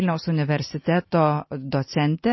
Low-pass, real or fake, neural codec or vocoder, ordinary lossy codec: 7.2 kHz; real; none; MP3, 24 kbps